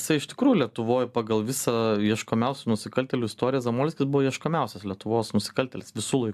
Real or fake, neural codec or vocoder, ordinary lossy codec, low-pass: real; none; AAC, 96 kbps; 14.4 kHz